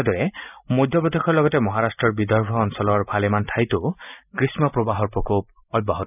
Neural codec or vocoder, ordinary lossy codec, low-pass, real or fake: none; none; 3.6 kHz; real